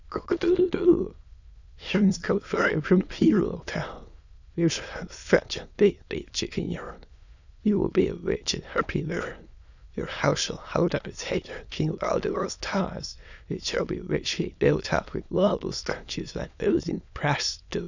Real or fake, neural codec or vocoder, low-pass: fake; autoencoder, 22.05 kHz, a latent of 192 numbers a frame, VITS, trained on many speakers; 7.2 kHz